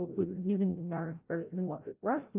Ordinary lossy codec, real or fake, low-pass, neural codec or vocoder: Opus, 24 kbps; fake; 3.6 kHz; codec, 16 kHz, 0.5 kbps, FreqCodec, larger model